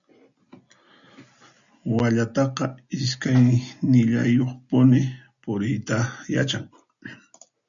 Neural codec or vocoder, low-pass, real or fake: none; 7.2 kHz; real